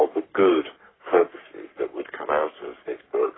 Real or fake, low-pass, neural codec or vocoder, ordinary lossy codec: fake; 7.2 kHz; codec, 44.1 kHz, 3.4 kbps, Pupu-Codec; AAC, 16 kbps